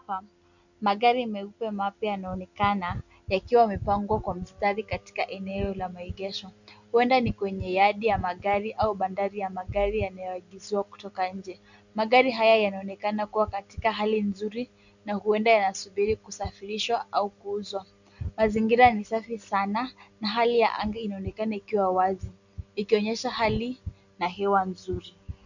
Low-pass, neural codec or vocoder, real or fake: 7.2 kHz; none; real